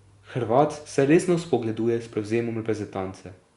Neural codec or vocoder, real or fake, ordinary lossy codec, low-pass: none; real; Opus, 64 kbps; 10.8 kHz